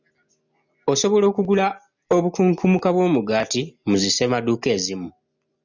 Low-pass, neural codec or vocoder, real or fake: 7.2 kHz; none; real